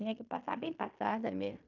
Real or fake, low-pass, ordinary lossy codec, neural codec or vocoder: fake; 7.2 kHz; none; codec, 16 kHz in and 24 kHz out, 0.9 kbps, LongCat-Audio-Codec, fine tuned four codebook decoder